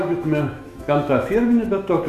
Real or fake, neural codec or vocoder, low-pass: fake; autoencoder, 48 kHz, 128 numbers a frame, DAC-VAE, trained on Japanese speech; 14.4 kHz